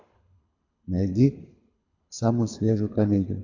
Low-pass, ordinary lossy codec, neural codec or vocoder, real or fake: 7.2 kHz; AAC, 48 kbps; codec, 24 kHz, 6 kbps, HILCodec; fake